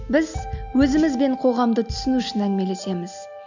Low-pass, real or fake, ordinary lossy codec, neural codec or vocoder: 7.2 kHz; real; none; none